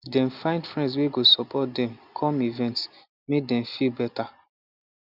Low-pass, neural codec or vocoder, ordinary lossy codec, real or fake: 5.4 kHz; none; none; real